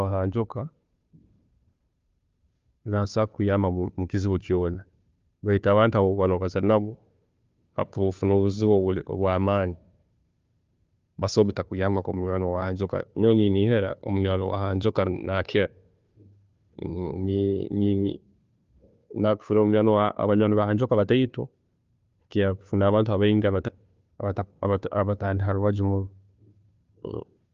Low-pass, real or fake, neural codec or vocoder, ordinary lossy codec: 7.2 kHz; fake; codec, 16 kHz, 2 kbps, FunCodec, trained on Chinese and English, 25 frames a second; Opus, 32 kbps